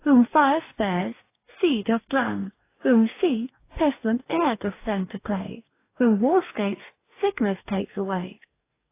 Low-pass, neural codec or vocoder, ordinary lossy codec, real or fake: 3.6 kHz; codec, 44.1 kHz, 2.6 kbps, DAC; AAC, 24 kbps; fake